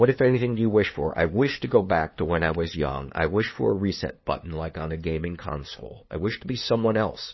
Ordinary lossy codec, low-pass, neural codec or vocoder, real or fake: MP3, 24 kbps; 7.2 kHz; codec, 16 kHz, 2 kbps, FunCodec, trained on LibriTTS, 25 frames a second; fake